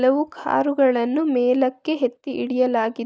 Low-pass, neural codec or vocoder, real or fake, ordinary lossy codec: none; none; real; none